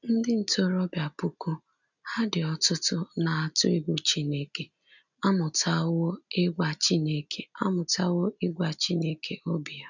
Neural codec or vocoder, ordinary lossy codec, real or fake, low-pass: none; none; real; 7.2 kHz